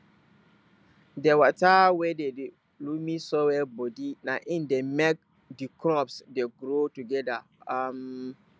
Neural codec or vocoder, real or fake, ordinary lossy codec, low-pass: none; real; none; none